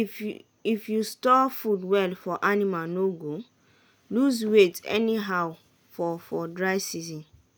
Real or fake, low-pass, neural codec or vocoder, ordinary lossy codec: real; none; none; none